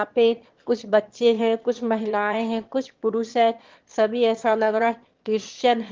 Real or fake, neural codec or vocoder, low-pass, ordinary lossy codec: fake; autoencoder, 22.05 kHz, a latent of 192 numbers a frame, VITS, trained on one speaker; 7.2 kHz; Opus, 16 kbps